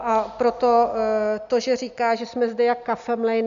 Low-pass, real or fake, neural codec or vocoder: 7.2 kHz; real; none